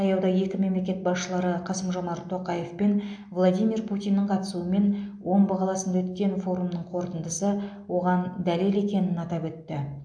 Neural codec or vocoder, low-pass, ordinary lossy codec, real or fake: none; 9.9 kHz; AAC, 64 kbps; real